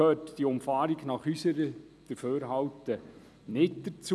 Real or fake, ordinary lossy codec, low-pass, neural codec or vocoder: real; none; none; none